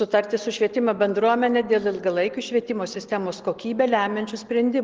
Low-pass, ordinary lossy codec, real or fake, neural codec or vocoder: 7.2 kHz; Opus, 16 kbps; real; none